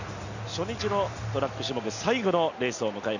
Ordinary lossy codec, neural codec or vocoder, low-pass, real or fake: none; none; 7.2 kHz; real